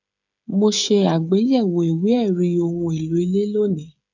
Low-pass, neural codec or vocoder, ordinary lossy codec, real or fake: 7.2 kHz; codec, 16 kHz, 8 kbps, FreqCodec, smaller model; none; fake